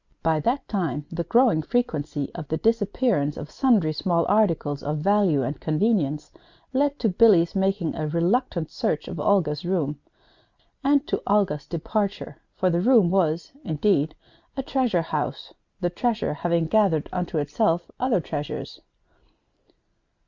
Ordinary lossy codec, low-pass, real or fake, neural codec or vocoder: Opus, 64 kbps; 7.2 kHz; real; none